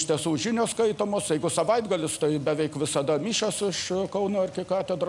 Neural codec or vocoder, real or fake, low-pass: none; real; 10.8 kHz